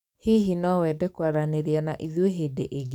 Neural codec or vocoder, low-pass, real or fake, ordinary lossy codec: codec, 44.1 kHz, 7.8 kbps, DAC; 19.8 kHz; fake; none